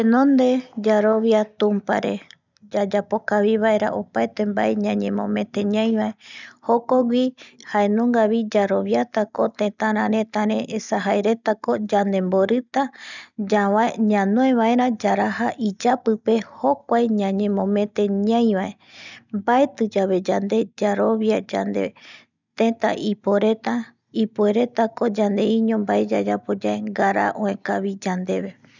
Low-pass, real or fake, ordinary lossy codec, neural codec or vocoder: 7.2 kHz; real; none; none